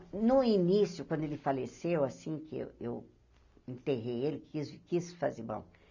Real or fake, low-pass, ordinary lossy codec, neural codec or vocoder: real; 7.2 kHz; none; none